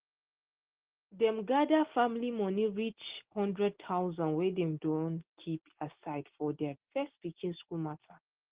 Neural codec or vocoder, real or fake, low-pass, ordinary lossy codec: none; real; 3.6 kHz; Opus, 16 kbps